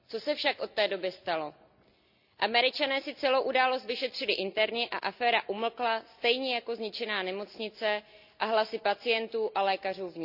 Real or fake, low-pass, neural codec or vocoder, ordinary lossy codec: real; 5.4 kHz; none; none